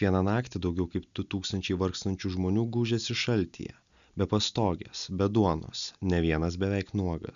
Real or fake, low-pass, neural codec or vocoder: real; 7.2 kHz; none